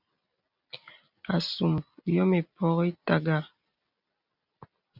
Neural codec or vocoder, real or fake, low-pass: none; real; 5.4 kHz